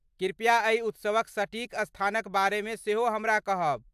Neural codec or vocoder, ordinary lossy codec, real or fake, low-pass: none; AAC, 96 kbps; real; 14.4 kHz